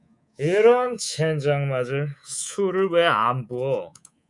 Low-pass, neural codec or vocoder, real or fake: 10.8 kHz; codec, 24 kHz, 3.1 kbps, DualCodec; fake